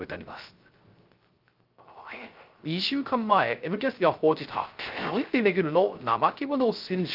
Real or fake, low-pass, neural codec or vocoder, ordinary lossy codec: fake; 5.4 kHz; codec, 16 kHz, 0.3 kbps, FocalCodec; Opus, 32 kbps